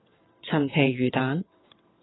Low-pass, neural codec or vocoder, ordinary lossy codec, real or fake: 7.2 kHz; vocoder, 22.05 kHz, 80 mel bands, WaveNeXt; AAC, 16 kbps; fake